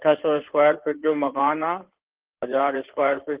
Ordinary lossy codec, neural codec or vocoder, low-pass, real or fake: Opus, 16 kbps; codec, 16 kHz in and 24 kHz out, 2.2 kbps, FireRedTTS-2 codec; 3.6 kHz; fake